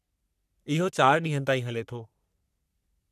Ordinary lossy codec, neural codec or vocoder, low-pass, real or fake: none; codec, 44.1 kHz, 3.4 kbps, Pupu-Codec; 14.4 kHz; fake